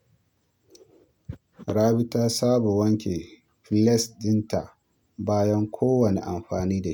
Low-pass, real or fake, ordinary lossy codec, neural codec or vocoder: none; real; none; none